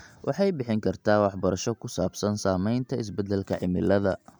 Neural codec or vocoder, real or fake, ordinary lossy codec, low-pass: none; real; none; none